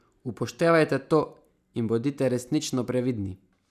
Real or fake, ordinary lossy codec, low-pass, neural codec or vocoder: real; none; 14.4 kHz; none